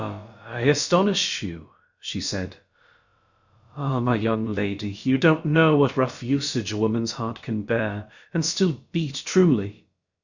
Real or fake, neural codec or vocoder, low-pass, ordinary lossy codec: fake; codec, 16 kHz, about 1 kbps, DyCAST, with the encoder's durations; 7.2 kHz; Opus, 64 kbps